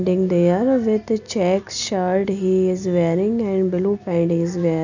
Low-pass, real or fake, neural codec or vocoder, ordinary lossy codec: 7.2 kHz; real; none; none